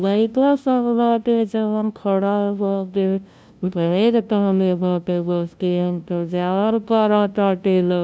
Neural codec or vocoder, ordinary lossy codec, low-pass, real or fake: codec, 16 kHz, 0.5 kbps, FunCodec, trained on LibriTTS, 25 frames a second; none; none; fake